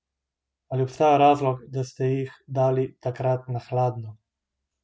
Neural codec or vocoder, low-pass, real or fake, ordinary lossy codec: none; none; real; none